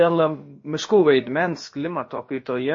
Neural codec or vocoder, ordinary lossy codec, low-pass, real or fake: codec, 16 kHz, about 1 kbps, DyCAST, with the encoder's durations; MP3, 32 kbps; 7.2 kHz; fake